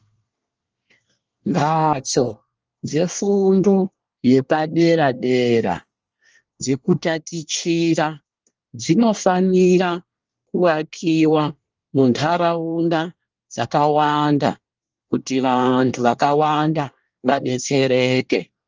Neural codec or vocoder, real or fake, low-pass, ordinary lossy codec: codec, 24 kHz, 1 kbps, SNAC; fake; 7.2 kHz; Opus, 24 kbps